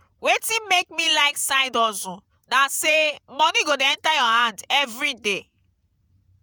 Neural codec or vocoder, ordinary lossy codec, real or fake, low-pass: vocoder, 48 kHz, 128 mel bands, Vocos; none; fake; none